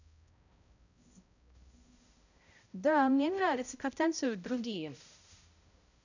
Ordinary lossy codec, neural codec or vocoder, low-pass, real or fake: none; codec, 16 kHz, 0.5 kbps, X-Codec, HuBERT features, trained on balanced general audio; 7.2 kHz; fake